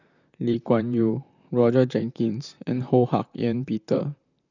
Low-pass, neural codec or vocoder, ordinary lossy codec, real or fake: 7.2 kHz; vocoder, 44.1 kHz, 128 mel bands, Pupu-Vocoder; none; fake